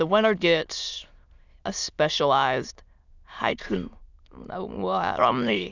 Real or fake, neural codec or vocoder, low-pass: fake; autoencoder, 22.05 kHz, a latent of 192 numbers a frame, VITS, trained on many speakers; 7.2 kHz